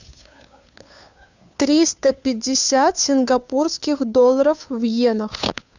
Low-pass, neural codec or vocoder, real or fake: 7.2 kHz; codec, 16 kHz, 2 kbps, FunCodec, trained on Chinese and English, 25 frames a second; fake